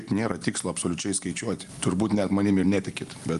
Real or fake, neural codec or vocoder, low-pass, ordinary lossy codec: real; none; 10.8 kHz; Opus, 32 kbps